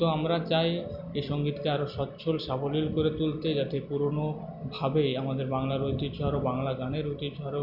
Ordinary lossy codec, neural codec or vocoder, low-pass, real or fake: none; none; 5.4 kHz; real